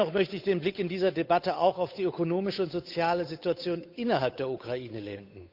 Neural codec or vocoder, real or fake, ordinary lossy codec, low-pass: codec, 16 kHz, 8 kbps, FunCodec, trained on Chinese and English, 25 frames a second; fake; none; 5.4 kHz